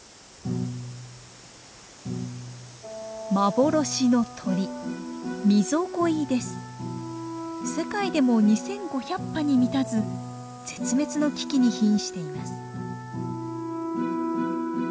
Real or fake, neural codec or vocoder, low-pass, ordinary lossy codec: real; none; none; none